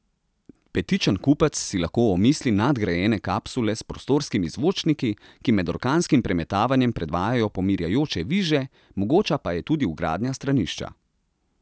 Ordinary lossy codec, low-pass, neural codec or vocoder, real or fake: none; none; none; real